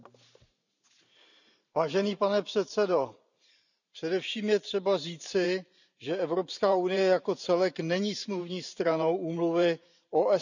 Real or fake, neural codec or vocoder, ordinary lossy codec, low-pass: fake; vocoder, 44.1 kHz, 128 mel bands every 512 samples, BigVGAN v2; none; 7.2 kHz